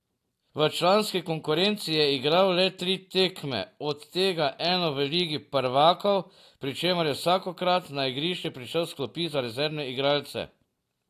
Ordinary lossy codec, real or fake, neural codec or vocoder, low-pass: AAC, 64 kbps; real; none; 14.4 kHz